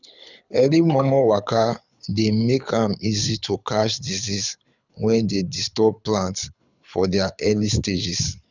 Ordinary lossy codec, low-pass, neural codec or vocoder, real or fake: none; 7.2 kHz; codec, 24 kHz, 6 kbps, HILCodec; fake